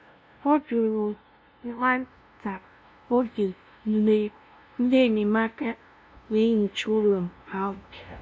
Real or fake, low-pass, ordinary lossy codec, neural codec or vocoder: fake; none; none; codec, 16 kHz, 0.5 kbps, FunCodec, trained on LibriTTS, 25 frames a second